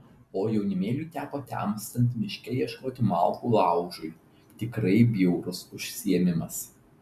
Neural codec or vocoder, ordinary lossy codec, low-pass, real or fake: none; MP3, 96 kbps; 14.4 kHz; real